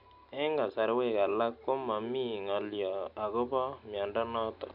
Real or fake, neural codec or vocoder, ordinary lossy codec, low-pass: real; none; none; 5.4 kHz